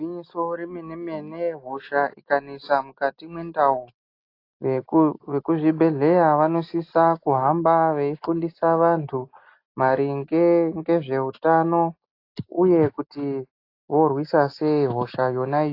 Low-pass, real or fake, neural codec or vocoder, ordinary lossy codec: 5.4 kHz; real; none; AAC, 32 kbps